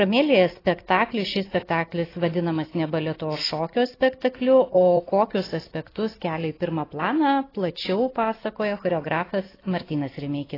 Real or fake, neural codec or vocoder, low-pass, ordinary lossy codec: real; none; 5.4 kHz; AAC, 24 kbps